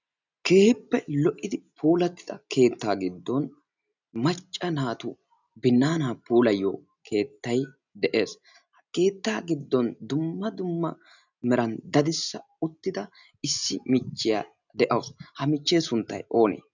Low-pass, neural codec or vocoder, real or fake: 7.2 kHz; none; real